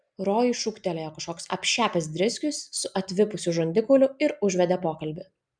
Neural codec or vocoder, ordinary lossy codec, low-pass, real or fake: none; MP3, 96 kbps; 9.9 kHz; real